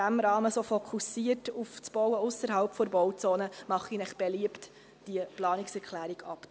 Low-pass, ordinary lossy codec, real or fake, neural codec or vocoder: none; none; real; none